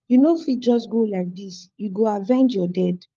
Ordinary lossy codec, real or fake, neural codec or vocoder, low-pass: Opus, 24 kbps; fake; codec, 16 kHz, 4 kbps, FunCodec, trained on LibriTTS, 50 frames a second; 7.2 kHz